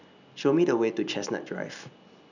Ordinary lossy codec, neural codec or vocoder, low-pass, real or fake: none; none; 7.2 kHz; real